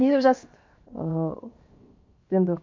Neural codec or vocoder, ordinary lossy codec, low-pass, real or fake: codec, 16 kHz, 0.7 kbps, FocalCodec; MP3, 48 kbps; 7.2 kHz; fake